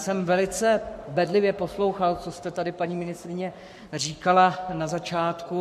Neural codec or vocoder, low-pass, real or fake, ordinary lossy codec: codec, 44.1 kHz, 7.8 kbps, Pupu-Codec; 14.4 kHz; fake; MP3, 64 kbps